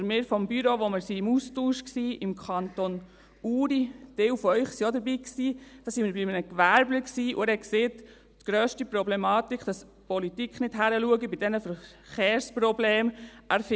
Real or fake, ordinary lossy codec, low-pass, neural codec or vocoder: real; none; none; none